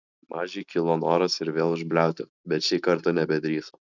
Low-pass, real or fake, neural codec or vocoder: 7.2 kHz; real; none